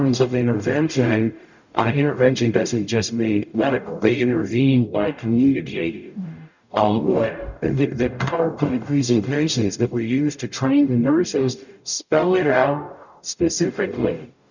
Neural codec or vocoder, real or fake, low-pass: codec, 44.1 kHz, 0.9 kbps, DAC; fake; 7.2 kHz